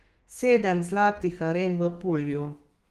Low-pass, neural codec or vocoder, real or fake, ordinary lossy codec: 14.4 kHz; codec, 32 kHz, 1.9 kbps, SNAC; fake; Opus, 24 kbps